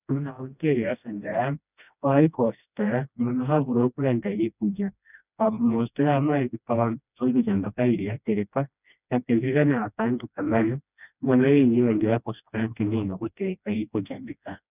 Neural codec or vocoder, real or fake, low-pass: codec, 16 kHz, 1 kbps, FreqCodec, smaller model; fake; 3.6 kHz